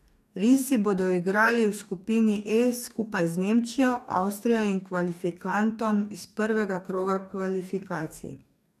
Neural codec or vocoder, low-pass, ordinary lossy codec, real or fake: codec, 44.1 kHz, 2.6 kbps, DAC; 14.4 kHz; none; fake